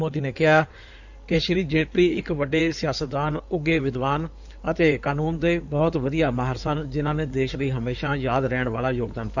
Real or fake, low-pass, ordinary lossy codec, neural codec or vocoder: fake; 7.2 kHz; none; codec, 16 kHz in and 24 kHz out, 2.2 kbps, FireRedTTS-2 codec